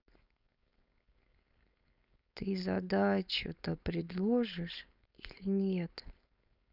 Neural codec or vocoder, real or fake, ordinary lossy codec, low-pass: codec, 16 kHz, 4.8 kbps, FACodec; fake; none; 5.4 kHz